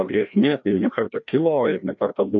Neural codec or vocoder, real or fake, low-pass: codec, 16 kHz, 1 kbps, FreqCodec, larger model; fake; 7.2 kHz